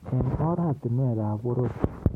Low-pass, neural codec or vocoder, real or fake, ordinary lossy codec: 19.8 kHz; none; real; MP3, 64 kbps